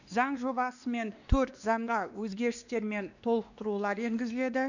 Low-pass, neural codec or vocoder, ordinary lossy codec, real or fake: 7.2 kHz; codec, 16 kHz, 2 kbps, X-Codec, WavLM features, trained on Multilingual LibriSpeech; none; fake